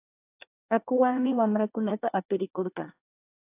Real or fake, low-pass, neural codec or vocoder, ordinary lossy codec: fake; 3.6 kHz; codec, 24 kHz, 1 kbps, SNAC; AAC, 32 kbps